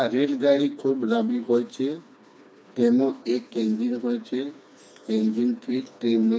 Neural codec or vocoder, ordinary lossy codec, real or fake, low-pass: codec, 16 kHz, 2 kbps, FreqCodec, smaller model; none; fake; none